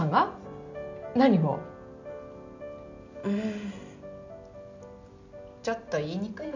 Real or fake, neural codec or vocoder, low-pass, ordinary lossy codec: real; none; 7.2 kHz; none